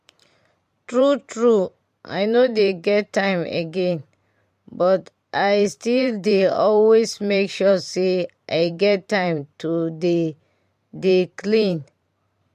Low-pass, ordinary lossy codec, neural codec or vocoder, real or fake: 14.4 kHz; MP3, 64 kbps; vocoder, 44.1 kHz, 128 mel bands every 512 samples, BigVGAN v2; fake